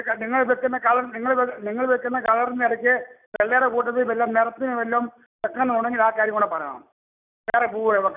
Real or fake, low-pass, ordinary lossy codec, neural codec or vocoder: real; 3.6 kHz; none; none